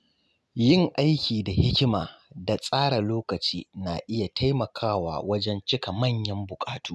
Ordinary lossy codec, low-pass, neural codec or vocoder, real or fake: none; none; none; real